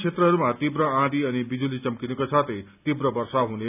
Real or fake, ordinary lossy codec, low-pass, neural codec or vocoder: real; none; 3.6 kHz; none